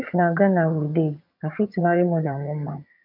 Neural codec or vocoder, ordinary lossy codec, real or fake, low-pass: vocoder, 22.05 kHz, 80 mel bands, HiFi-GAN; none; fake; 5.4 kHz